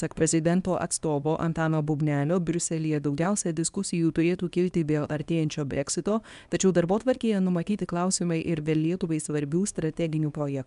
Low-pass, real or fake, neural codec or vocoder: 10.8 kHz; fake; codec, 24 kHz, 0.9 kbps, WavTokenizer, small release